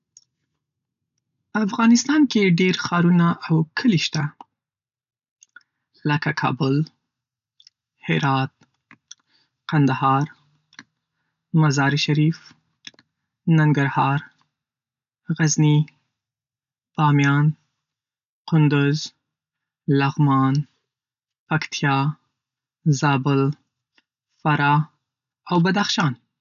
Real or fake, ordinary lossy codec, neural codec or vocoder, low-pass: real; none; none; 7.2 kHz